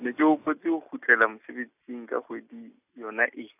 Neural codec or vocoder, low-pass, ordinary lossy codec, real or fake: none; 3.6 kHz; none; real